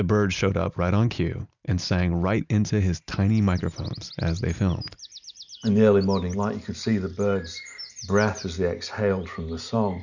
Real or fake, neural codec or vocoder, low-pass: real; none; 7.2 kHz